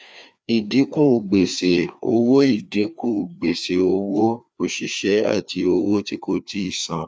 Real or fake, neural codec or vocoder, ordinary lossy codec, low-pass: fake; codec, 16 kHz, 2 kbps, FreqCodec, larger model; none; none